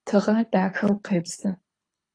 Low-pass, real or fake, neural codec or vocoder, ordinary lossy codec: 9.9 kHz; fake; codec, 24 kHz, 6 kbps, HILCodec; AAC, 32 kbps